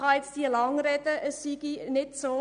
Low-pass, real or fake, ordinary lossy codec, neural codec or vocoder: 9.9 kHz; real; none; none